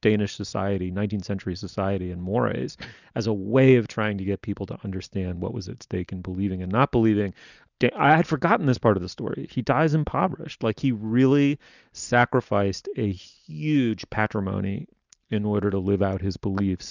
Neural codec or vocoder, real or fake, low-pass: none; real; 7.2 kHz